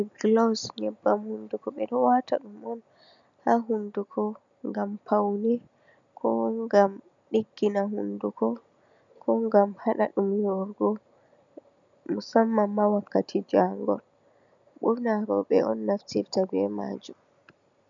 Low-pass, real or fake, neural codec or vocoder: 7.2 kHz; real; none